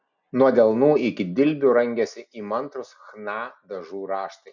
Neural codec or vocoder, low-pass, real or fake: none; 7.2 kHz; real